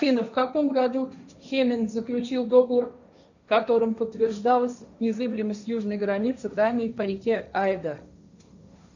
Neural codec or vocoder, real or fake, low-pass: codec, 16 kHz, 1.1 kbps, Voila-Tokenizer; fake; 7.2 kHz